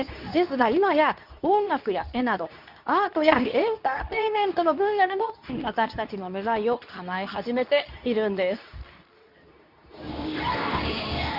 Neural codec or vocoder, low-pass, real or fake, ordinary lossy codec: codec, 24 kHz, 0.9 kbps, WavTokenizer, medium speech release version 2; 5.4 kHz; fake; none